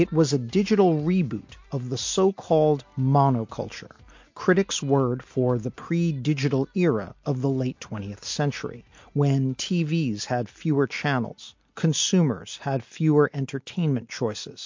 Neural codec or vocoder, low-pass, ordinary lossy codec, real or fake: none; 7.2 kHz; MP3, 48 kbps; real